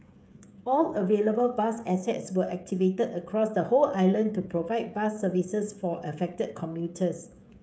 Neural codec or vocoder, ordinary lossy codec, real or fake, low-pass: codec, 16 kHz, 16 kbps, FreqCodec, smaller model; none; fake; none